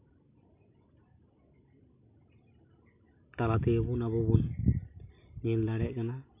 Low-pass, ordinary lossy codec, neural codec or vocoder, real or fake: 3.6 kHz; none; none; real